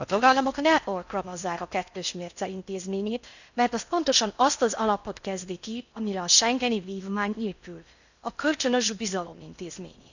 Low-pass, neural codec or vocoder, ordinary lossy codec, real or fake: 7.2 kHz; codec, 16 kHz in and 24 kHz out, 0.6 kbps, FocalCodec, streaming, 4096 codes; none; fake